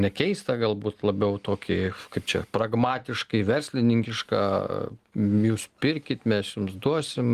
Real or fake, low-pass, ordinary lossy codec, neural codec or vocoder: real; 14.4 kHz; Opus, 24 kbps; none